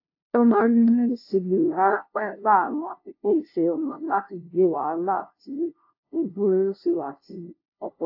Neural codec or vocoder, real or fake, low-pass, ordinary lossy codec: codec, 16 kHz, 0.5 kbps, FunCodec, trained on LibriTTS, 25 frames a second; fake; 5.4 kHz; AAC, 32 kbps